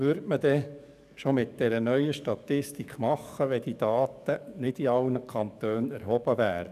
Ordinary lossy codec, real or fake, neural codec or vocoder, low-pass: none; fake; codec, 44.1 kHz, 7.8 kbps, DAC; 14.4 kHz